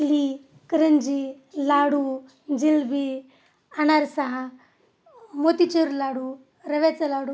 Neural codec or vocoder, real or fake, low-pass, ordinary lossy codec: none; real; none; none